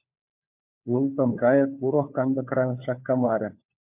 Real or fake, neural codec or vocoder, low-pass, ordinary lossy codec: fake; codec, 16 kHz, 4 kbps, FunCodec, trained on LibriTTS, 50 frames a second; 3.6 kHz; MP3, 32 kbps